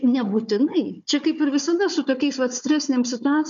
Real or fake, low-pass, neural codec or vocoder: fake; 7.2 kHz; codec, 16 kHz, 4 kbps, FunCodec, trained on Chinese and English, 50 frames a second